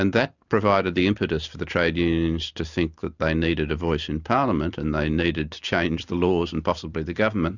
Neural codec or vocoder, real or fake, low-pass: none; real; 7.2 kHz